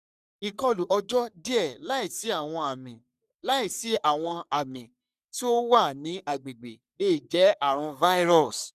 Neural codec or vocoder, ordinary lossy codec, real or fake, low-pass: codec, 44.1 kHz, 3.4 kbps, Pupu-Codec; none; fake; 14.4 kHz